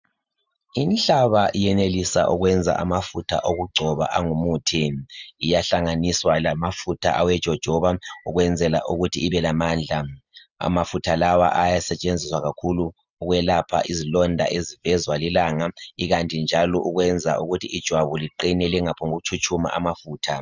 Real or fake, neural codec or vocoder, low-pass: real; none; 7.2 kHz